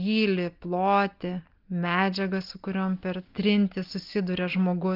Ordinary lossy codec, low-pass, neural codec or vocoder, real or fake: Opus, 32 kbps; 5.4 kHz; none; real